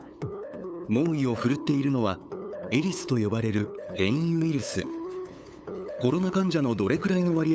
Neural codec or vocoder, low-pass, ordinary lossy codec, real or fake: codec, 16 kHz, 8 kbps, FunCodec, trained on LibriTTS, 25 frames a second; none; none; fake